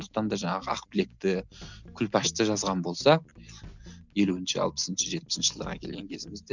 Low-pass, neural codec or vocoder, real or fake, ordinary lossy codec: 7.2 kHz; none; real; none